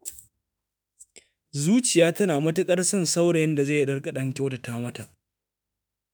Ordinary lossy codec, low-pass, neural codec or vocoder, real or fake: none; none; autoencoder, 48 kHz, 32 numbers a frame, DAC-VAE, trained on Japanese speech; fake